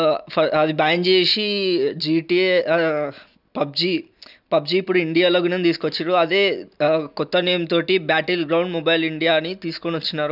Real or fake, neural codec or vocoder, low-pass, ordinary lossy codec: real; none; 5.4 kHz; none